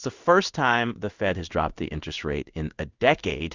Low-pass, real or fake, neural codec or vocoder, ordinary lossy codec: 7.2 kHz; fake; codec, 16 kHz in and 24 kHz out, 1 kbps, XY-Tokenizer; Opus, 64 kbps